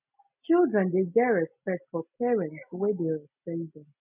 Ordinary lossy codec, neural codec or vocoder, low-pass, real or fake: none; none; 3.6 kHz; real